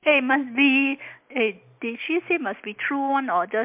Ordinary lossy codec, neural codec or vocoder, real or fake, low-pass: MP3, 32 kbps; none; real; 3.6 kHz